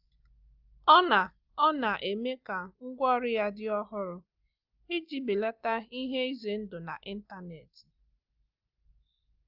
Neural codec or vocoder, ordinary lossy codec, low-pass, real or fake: none; Opus, 32 kbps; 5.4 kHz; real